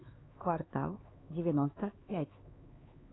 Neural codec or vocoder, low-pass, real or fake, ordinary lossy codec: codec, 16 kHz, 4 kbps, X-Codec, HuBERT features, trained on LibriSpeech; 7.2 kHz; fake; AAC, 16 kbps